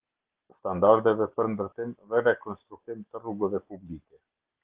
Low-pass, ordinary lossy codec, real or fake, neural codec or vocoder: 3.6 kHz; Opus, 16 kbps; real; none